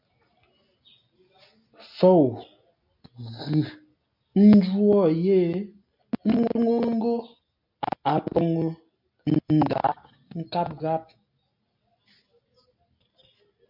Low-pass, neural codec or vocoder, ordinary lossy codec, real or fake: 5.4 kHz; none; MP3, 48 kbps; real